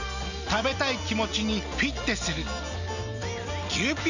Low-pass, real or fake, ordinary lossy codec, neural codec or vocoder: 7.2 kHz; real; none; none